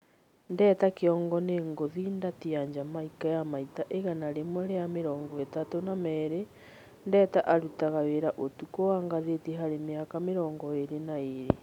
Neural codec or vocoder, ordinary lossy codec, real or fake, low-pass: none; none; real; 19.8 kHz